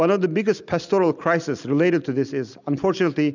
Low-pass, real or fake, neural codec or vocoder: 7.2 kHz; real; none